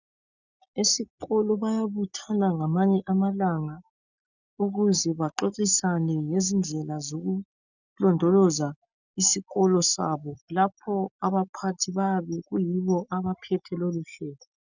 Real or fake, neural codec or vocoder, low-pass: real; none; 7.2 kHz